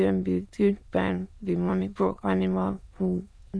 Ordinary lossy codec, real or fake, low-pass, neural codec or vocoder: none; fake; none; autoencoder, 22.05 kHz, a latent of 192 numbers a frame, VITS, trained on many speakers